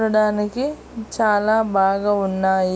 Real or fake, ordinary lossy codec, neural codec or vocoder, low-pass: real; none; none; none